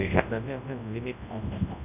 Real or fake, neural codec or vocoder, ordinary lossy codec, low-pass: fake; codec, 24 kHz, 0.9 kbps, WavTokenizer, large speech release; none; 3.6 kHz